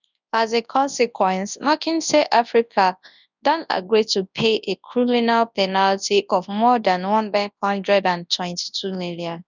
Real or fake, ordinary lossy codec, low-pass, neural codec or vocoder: fake; none; 7.2 kHz; codec, 24 kHz, 0.9 kbps, WavTokenizer, large speech release